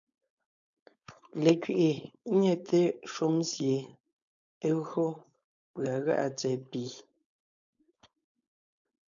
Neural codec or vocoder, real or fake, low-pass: codec, 16 kHz, 4.8 kbps, FACodec; fake; 7.2 kHz